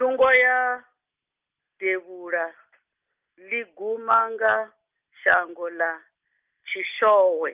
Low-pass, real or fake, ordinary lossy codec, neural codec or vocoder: 3.6 kHz; real; Opus, 32 kbps; none